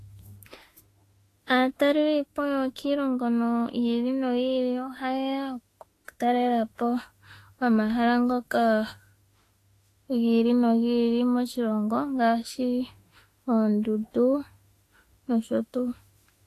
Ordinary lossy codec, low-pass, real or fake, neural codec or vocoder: AAC, 48 kbps; 14.4 kHz; fake; autoencoder, 48 kHz, 32 numbers a frame, DAC-VAE, trained on Japanese speech